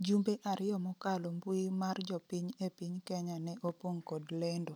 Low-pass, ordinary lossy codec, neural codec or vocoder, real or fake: none; none; none; real